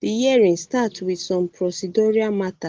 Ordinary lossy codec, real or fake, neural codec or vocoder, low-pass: Opus, 16 kbps; real; none; 7.2 kHz